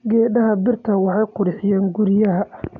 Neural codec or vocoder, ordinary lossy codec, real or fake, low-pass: none; none; real; 7.2 kHz